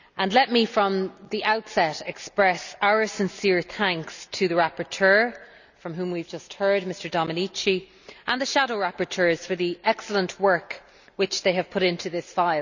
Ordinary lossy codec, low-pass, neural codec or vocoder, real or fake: none; 7.2 kHz; none; real